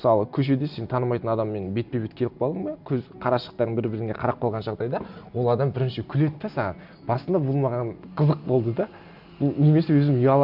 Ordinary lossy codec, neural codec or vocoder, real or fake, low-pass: none; none; real; 5.4 kHz